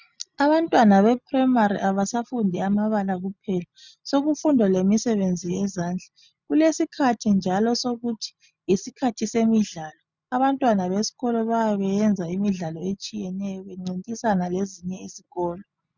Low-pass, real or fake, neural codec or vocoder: 7.2 kHz; real; none